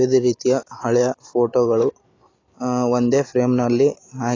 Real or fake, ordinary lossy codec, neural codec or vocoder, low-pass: real; AAC, 32 kbps; none; 7.2 kHz